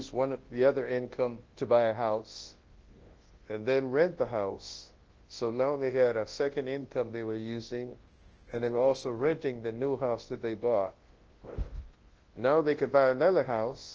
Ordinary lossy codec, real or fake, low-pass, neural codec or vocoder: Opus, 16 kbps; fake; 7.2 kHz; codec, 24 kHz, 0.9 kbps, WavTokenizer, large speech release